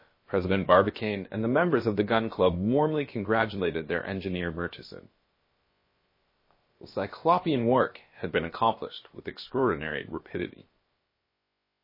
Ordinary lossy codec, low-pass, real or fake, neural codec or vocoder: MP3, 24 kbps; 5.4 kHz; fake; codec, 16 kHz, about 1 kbps, DyCAST, with the encoder's durations